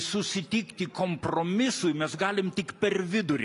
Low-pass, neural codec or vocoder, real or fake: 10.8 kHz; none; real